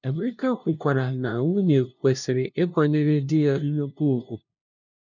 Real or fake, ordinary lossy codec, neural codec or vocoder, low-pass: fake; none; codec, 16 kHz, 0.5 kbps, FunCodec, trained on LibriTTS, 25 frames a second; 7.2 kHz